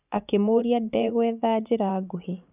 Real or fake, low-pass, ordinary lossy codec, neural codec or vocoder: fake; 3.6 kHz; none; vocoder, 44.1 kHz, 80 mel bands, Vocos